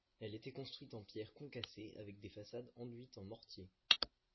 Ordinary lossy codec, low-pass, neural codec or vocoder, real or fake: MP3, 24 kbps; 7.2 kHz; none; real